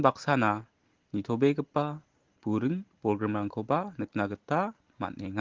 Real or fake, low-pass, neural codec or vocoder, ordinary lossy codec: real; 7.2 kHz; none; Opus, 16 kbps